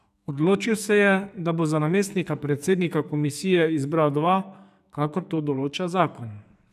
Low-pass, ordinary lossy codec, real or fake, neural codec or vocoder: 14.4 kHz; none; fake; codec, 44.1 kHz, 2.6 kbps, SNAC